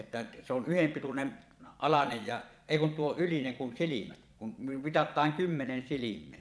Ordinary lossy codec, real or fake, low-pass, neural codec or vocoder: none; fake; none; vocoder, 22.05 kHz, 80 mel bands, Vocos